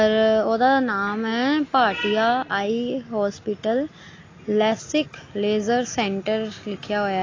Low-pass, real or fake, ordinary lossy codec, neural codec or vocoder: 7.2 kHz; real; AAC, 32 kbps; none